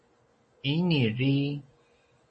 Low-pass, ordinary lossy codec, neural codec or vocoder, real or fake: 10.8 kHz; MP3, 32 kbps; none; real